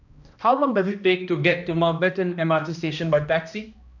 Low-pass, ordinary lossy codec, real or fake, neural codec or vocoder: 7.2 kHz; none; fake; codec, 16 kHz, 1 kbps, X-Codec, HuBERT features, trained on balanced general audio